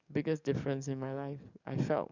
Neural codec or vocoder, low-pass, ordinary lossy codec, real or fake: codec, 44.1 kHz, 7.8 kbps, DAC; 7.2 kHz; Opus, 64 kbps; fake